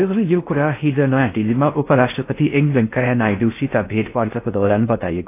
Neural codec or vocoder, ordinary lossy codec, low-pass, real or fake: codec, 16 kHz in and 24 kHz out, 0.6 kbps, FocalCodec, streaming, 4096 codes; AAC, 24 kbps; 3.6 kHz; fake